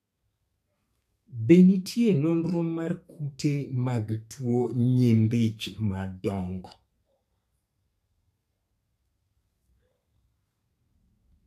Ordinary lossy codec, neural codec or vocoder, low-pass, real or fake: none; codec, 32 kHz, 1.9 kbps, SNAC; 14.4 kHz; fake